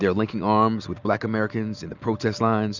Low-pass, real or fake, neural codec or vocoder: 7.2 kHz; real; none